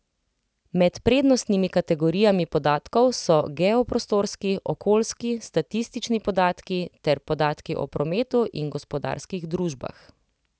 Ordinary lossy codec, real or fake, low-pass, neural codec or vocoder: none; real; none; none